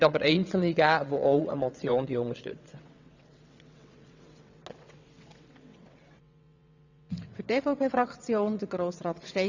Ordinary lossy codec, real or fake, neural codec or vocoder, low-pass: none; fake; vocoder, 22.05 kHz, 80 mel bands, WaveNeXt; 7.2 kHz